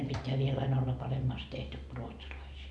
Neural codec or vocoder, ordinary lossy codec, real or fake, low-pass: none; none; real; none